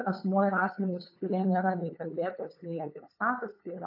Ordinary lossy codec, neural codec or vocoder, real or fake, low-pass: AAC, 48 kbps; codec, 16 kHz, 8 kbps, FunCodec, trained on LibriTTS, 25 frames a second; fake; 5.4 kHz